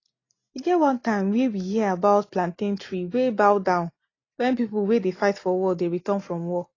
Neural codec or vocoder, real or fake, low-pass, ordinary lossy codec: none; real; 7.2 kHz; AAC, 32 kbps